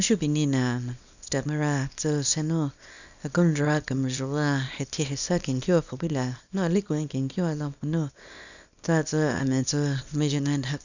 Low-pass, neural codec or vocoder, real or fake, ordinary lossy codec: 7.2 kHz; codec, 24 kHz, 0.9 kbps, WavTokenizer, small release; fake; none